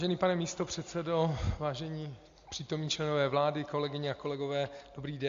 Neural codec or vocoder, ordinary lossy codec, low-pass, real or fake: none; MP3, 48 kbps; 7.2 kHz; real